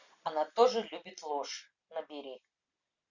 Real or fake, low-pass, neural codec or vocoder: real; 7.2 kHz; none